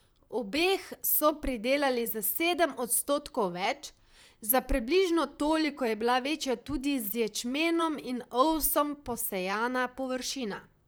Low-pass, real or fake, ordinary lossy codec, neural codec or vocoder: none; fake; none; vocoder, 44.1 kHz, 128 mel bands, Pupu-Vocoder